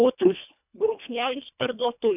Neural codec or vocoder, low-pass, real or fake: codec, 24 kHz, 1.5 kbps, HILCodec; 3.6 kHz; fake